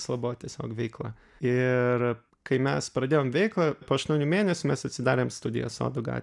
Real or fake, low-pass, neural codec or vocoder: real; 10.8 kHz; none